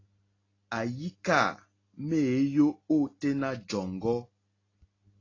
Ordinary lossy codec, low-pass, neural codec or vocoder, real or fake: AAC, 32 kbps; 7.2 kHz; none; real